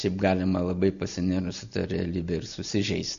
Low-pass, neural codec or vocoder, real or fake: 7.2 kHz; none; real